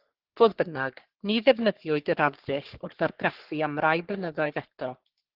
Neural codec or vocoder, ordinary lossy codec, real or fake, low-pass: codec, 44.1 kHz, 3.4 kbps, Pupu-Codec; Opus, 16 kbps; fake; 5.4 kHz